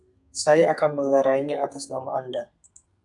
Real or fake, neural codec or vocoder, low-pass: fake; codec, 32 kHz, 1.9 kbps, SNAC; 10.8 kHz